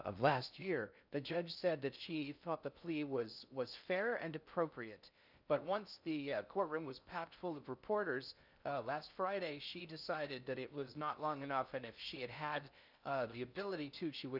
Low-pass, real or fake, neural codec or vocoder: 5.4 kHz; fake; codec, 16 kHz in and 24 kHz out, 0.6 kbps, FocalCodec, streaming, 2048 codes